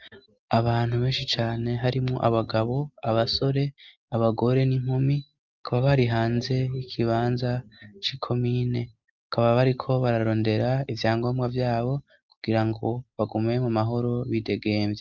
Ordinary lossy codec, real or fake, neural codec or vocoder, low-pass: Opus, 24 kbps; real; none; 7.2 kHz